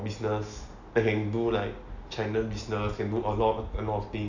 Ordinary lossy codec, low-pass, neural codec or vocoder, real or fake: none; 7.2 kHz; none; real